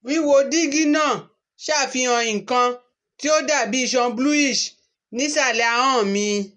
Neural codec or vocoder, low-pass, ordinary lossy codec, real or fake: none; 10.8 kHz; MP3, 48 kbps; real